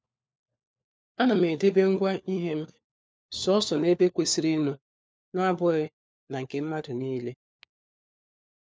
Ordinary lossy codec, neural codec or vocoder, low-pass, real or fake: none; codec, 16 kHz, 4 kbps, FunCodec, trained on LibriTTS, 50 frames a second; none; fake